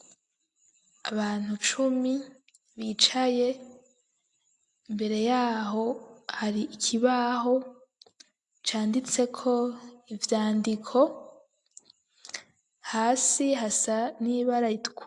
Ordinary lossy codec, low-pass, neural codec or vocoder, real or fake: AAC, 64 kbps; 10.8 kHz; none; real